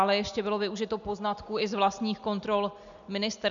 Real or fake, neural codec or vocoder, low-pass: real; none; 7.2 kHz